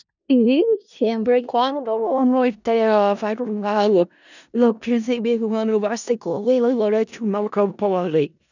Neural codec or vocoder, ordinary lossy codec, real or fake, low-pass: codec, 16 kHz in and 24 kHz out, 0.4 kbps, LongCat-Audio-Codec, four codebook decoder; none; fake; 7.2 kHz